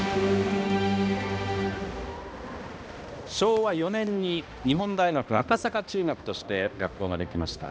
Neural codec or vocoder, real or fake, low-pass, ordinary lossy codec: codec, 16 kHz, 1 kbps, X-Codec, HuBERT features, trained on balanced general audio; fake; none; none